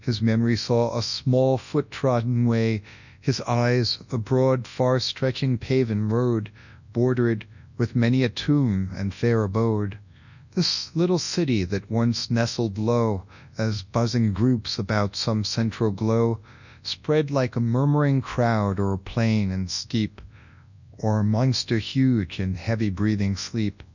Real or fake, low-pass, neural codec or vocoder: fake; 7.2 kHz; codec, 24 kHz, 0.9 kbps, WavTokenizer, large speech release